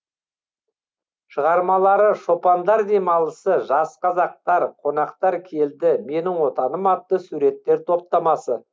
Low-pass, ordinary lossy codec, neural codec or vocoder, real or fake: none; none; none; real